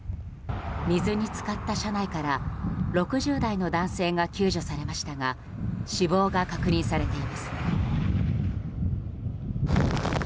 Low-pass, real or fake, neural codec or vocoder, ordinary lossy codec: none; real; none; none